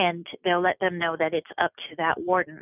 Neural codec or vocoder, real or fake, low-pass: none; real; 3.6 kHz